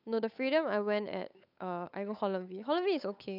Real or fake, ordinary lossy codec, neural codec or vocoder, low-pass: fake; none; codec, 16 kHz, 8 kbps, FunCodec, trained on Chinese and English, 25 frames a second; 5.4 kHz